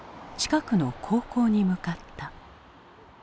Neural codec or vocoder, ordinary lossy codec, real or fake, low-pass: none; none; real; none